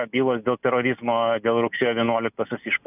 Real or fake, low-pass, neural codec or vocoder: real; 3.6 kHz; none